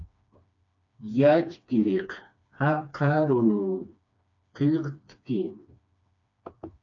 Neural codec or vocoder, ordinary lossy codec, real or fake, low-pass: codec, 16 kHz, 2 kbps, FreqCodec, smaller model; MP3, 64 kbps; fake; 7.2 kHz